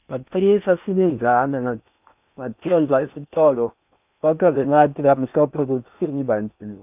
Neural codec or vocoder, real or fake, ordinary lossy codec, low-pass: codec, 16 kHz in and 24 kHz out, 0.8 kbps, FocalCodec, streaming, 65536 codes; fake; none; 3.6 kHz